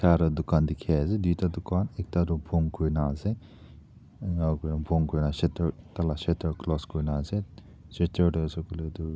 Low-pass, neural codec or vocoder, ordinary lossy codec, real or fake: none; none; none; real